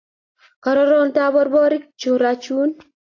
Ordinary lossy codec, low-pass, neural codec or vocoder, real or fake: AAC, 32 kbps; 7.2 kHz; none; real